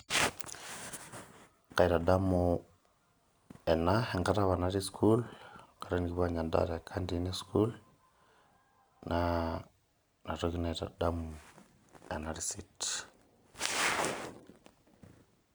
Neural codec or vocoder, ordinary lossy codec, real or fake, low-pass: none; none; real; none